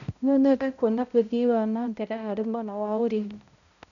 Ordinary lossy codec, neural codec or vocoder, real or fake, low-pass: none; codec, 16 kHz, 0.5 kbps, X-Codec, HuBERT features, trained on balanced general audio; fake; 7.2 kHz